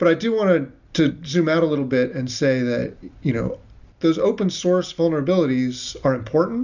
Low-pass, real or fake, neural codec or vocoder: 7.2 kHz; real; none